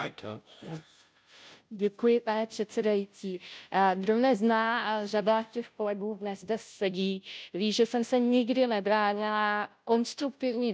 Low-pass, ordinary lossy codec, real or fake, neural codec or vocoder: none; none; fake; codec, 16 kHz, 0.5 kbps, FunCodec, trained on Chinese and English, 25 frames a second